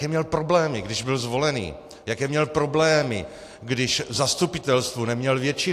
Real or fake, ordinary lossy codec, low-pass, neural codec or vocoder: real; AAC, 64 kbps; 14.4 kHz; none